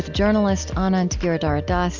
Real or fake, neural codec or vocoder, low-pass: real; none; 7.2 kHz